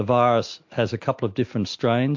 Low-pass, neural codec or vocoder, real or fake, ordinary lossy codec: 7.2 kHz; none; real; MP3, 48 kbps